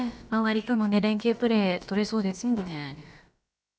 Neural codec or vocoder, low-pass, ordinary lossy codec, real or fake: codec, 16 kHz, about 1 kbps, DyCAST, with the encoder's durations; none; none; fake